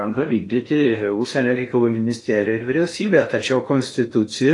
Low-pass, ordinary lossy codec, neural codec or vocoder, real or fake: 10.8 kHz; AAC, 48 kbps; codec, 16 kHz in and 24 kHz out, 0.6 kbps, FocalCodec, streaming, 4096 codes; fake